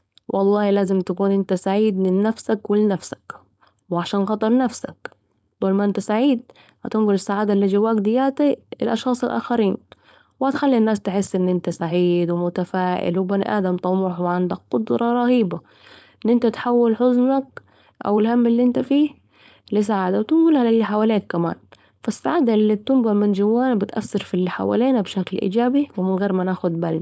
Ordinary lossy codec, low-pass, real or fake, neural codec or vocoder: none; none; fake; codec, 16 kHz, 4.8 kbps, FACodec